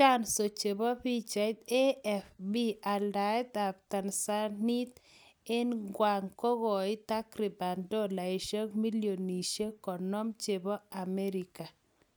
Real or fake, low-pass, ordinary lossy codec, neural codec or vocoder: real; none; none; none